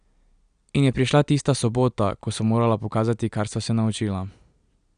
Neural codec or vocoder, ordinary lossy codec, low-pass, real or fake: none; none; 9.9 kHz; real